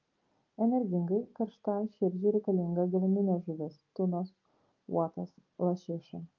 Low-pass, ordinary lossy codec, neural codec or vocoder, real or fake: 7.2 kHz; Opus, 32 kbps; none; real